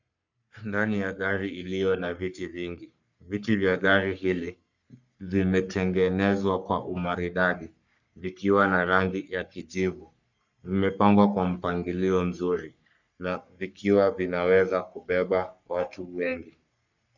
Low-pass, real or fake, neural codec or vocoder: 7.2 kHz; fake; codec, 44.1 kHz, 3.4 kbps, Pupu-Codec